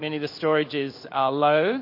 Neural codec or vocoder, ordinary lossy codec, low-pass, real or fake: codec, 16 kHz in and 24 kHz out, 1 kbps, XY-Tokenizer; MP3, 32 kbps; 5.4 kHz; fake